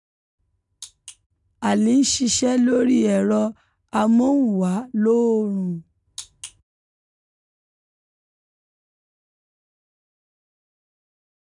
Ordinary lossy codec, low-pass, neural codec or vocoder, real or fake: none; 10.8 kHz; none; real